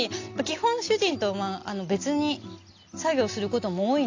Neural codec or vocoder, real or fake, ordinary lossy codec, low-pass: none; real; AAC, 48 kbps; 7.2 kHz